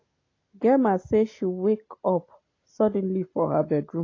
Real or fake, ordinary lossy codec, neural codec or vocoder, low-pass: real; AAC, 32 kbps; none; 7.2 kHz